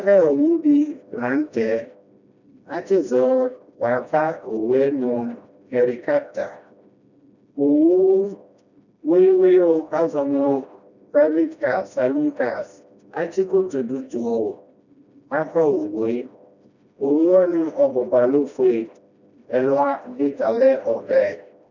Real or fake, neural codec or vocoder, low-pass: fake; codec, 16 kHz, 1 kbps, FreqCodec, smaller model; 7.2 kHz